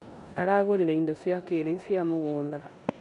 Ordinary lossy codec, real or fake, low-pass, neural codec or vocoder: none; fake; 10.8 kHz; codec, 16 kHz in and 24 kHz out, 0.9 kbps, LongCat-Audio-Codec, four codebook decoder